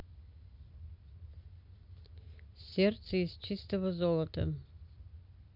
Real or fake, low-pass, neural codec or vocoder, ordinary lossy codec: real; 5.4 kHz; none; MP3, 48 kbps